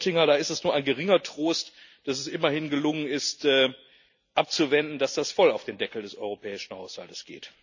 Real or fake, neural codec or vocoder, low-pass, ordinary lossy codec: real; none; 7.2 kHz; none